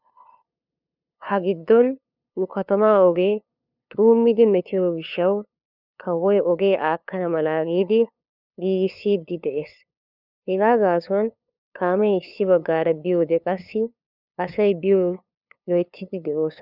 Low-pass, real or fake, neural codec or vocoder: 5.4 kHz; fake; codec, 16 kHz, 2 kbps, FunCodec, trained on LibriTTS, 25 frames a second